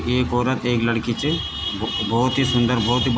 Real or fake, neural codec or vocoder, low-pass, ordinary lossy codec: real; none; none; none